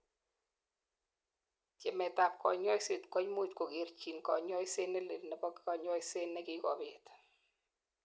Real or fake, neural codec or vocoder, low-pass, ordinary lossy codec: real; none; none; none